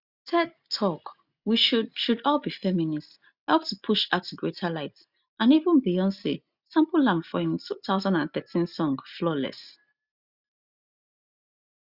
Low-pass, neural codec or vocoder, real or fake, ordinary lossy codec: 5.4 kHz; none; real; Opus, 64 kbps